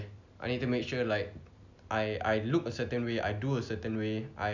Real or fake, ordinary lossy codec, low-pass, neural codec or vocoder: real; none; 7.2 kHz; none